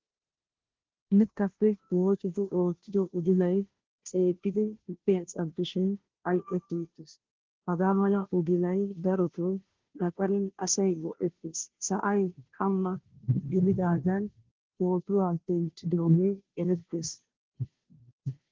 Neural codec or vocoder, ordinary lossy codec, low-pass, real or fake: codec, 16 kHz, 0.5 kbps, FunCodec, trained on Chinese and English, 25 frames a second; Opus, 16 kbps; 7.2 kHz; fake